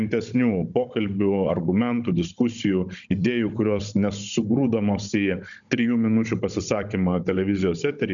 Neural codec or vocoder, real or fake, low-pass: codec, 16 kHz, 16 kbps, FunCodec, trained on Chinese and English, 50 frames a second; fake; 7.2 kHz